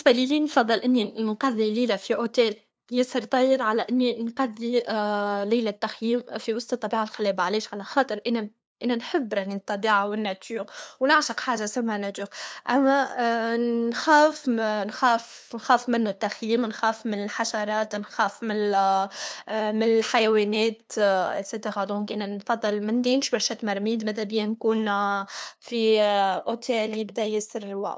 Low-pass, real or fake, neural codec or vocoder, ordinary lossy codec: none; fake; codec, 16 kHz, 2 kbps, FunCodec, trained on LibriTTS, 25 frames a second; none